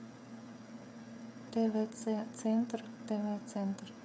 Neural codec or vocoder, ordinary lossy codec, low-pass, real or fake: codec, 16 kHz, 16 kbps, FreqCodec, smaller model; none; none; fake